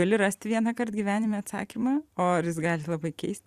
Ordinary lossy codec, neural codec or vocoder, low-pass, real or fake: Opus, 64 kbps; vocoder, 44.1 kHz, 128 mel bands every 256 samples, BigVGAN v2; 14.4 kHz; fake